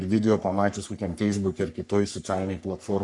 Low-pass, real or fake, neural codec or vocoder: 10.8 kHz; fake; codec, 44.1 kHz, 3.4 kbps, Pupu-Codec